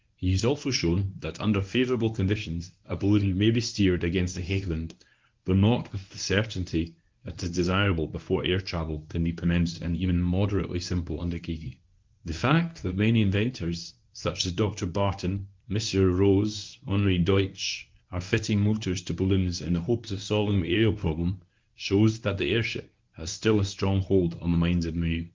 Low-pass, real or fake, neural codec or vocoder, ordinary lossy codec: 7.2 kHz; fake; codec, 24 kHz, 0.9 kbps, WavTokenizer, medium speech release version 1; Opus, 24 kbps